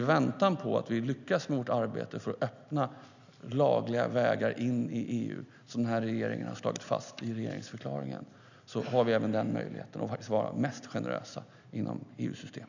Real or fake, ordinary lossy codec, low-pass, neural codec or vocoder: real; none; 7.2 kHz; none